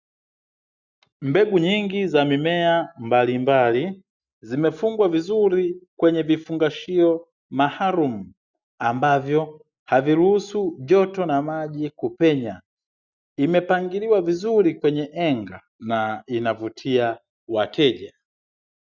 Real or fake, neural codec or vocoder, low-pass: real; none; 7.2 kHz